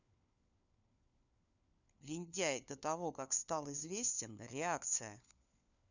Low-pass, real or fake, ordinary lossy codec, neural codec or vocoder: 7.2 kHz; fake; none; codec, 16 kHz, 4 kbps, FunCodec, trained on LibriTTS, 50 frames a second